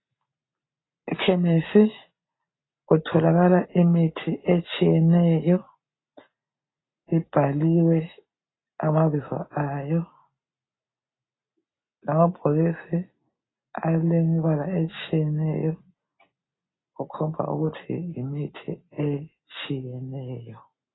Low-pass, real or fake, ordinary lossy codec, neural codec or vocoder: 7.2 kHz; real; AAC, 16 kbps; none